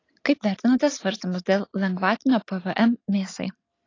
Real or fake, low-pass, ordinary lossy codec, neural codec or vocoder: real; 7.2 kHz; AAC, 32 kbps; none